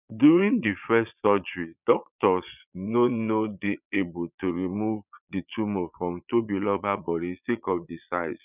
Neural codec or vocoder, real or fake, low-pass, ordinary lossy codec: vocoder, 22.05 kHz, 80 mel bands, Vocos; fake; 3.6 kHz; none